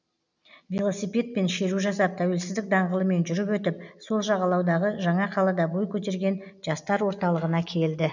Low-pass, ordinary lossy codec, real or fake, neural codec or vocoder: 7.2 kHz; none; real; none